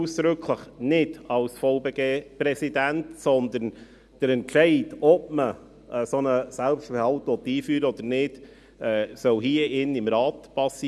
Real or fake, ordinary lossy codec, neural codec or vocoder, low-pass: real; none; none; none